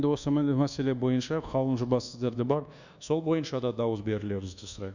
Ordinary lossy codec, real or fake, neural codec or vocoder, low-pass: none; fake; codec, 24 kHz, 1.2 kbps, DualCodec; 7.2 kHz